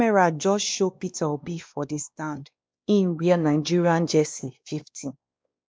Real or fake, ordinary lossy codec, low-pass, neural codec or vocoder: fake; none; none; codec, 16 kHz, 2 kbps, X-Codec, WavLM features, trained on Multilingual LibriSpeech